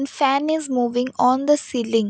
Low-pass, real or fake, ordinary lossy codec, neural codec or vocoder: none; real; none; none